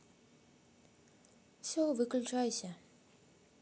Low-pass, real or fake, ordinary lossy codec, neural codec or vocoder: none; real; none; none